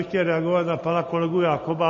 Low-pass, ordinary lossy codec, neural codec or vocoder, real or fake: 7.2 kHz; MP3, 32 kbps; none; real